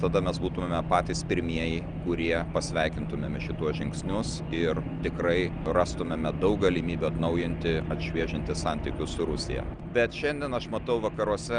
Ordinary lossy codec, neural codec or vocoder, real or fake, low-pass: Opus, 32 kbps; none; real; 9.9 kHz